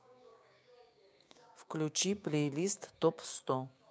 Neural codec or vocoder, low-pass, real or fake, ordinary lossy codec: codec, 16 kHz, 6 kbps, DAC; none; fake; none